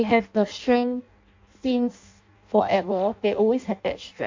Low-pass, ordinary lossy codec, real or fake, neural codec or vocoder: 7.2 kHz; MP3, 48 kbps; fake; codec, 16 kHz in and 24 kHz out, 0.6 kbps, FireRedTTS-2 codec